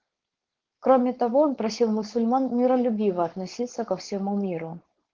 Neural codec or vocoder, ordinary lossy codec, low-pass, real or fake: codec, 16 kHz, 4.8 kbps, FACodec; Opus, 16 kbps; 7.2 kHz; fake